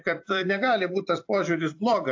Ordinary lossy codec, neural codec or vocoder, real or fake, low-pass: AAC, 48 kbps; vocoder, 44.1 kHz, 128 mel bands every 256 samples, BigVGAN v2; fake; 7.2 kHz